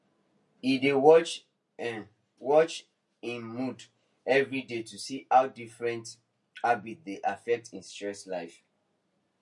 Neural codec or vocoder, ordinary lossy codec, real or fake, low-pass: none; MP3, 48 kbps; real; 10.8 kHz